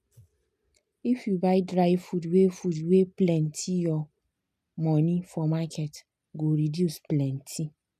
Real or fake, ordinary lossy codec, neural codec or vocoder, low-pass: real; none; none; 14.4 kHz